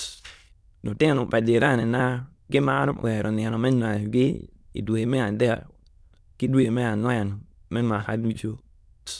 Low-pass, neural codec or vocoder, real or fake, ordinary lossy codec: none; autoencoder, 22.05 kHz, a latent of 192 numbers a frame, VITS, trained on many speakers; fake; none